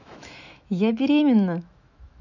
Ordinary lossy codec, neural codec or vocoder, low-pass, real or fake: none; autoencoder, 48 kHz, 128 numbers a frame, DAC-VAE, trained on Japanese speech; 7.2 kHz; fake